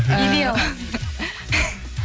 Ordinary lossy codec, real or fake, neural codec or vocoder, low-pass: none; real; none; none